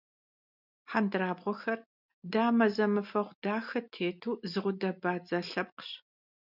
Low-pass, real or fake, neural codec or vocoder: 5.4 kHz; real; none